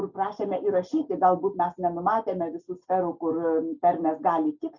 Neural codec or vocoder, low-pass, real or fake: none; 7.2 kHz; real